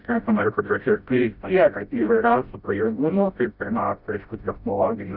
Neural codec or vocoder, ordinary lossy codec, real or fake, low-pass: codec, 16 kHz, 0.5 kbps, FreqCodec, smaller model; Opus, 64 kbps; fake; 5.4 kHz